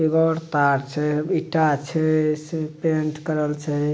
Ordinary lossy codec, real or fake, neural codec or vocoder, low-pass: none; real; none; none